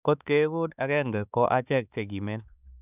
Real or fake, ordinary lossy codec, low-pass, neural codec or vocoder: fake; none; 3.6 kHz; codec, 16 kHz, 4 kbps, X-Codec, HuBERT features, trained on balanced general audio